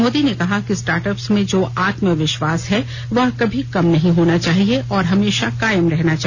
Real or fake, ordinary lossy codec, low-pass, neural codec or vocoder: real; none; none; none